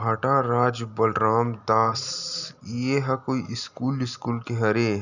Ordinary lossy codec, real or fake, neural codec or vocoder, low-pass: none; real; none; 7.2 kHz